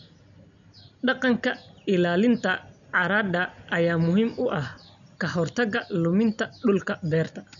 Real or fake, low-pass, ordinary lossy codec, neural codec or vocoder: real; 7.2 kHz; none; none